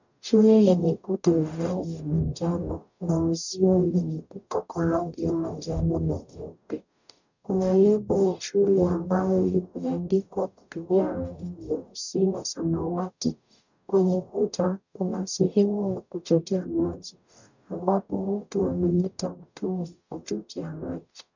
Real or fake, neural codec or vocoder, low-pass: fake; codec, 44.1 kHz, 0.9 kbps, DAC; 7.2 kHz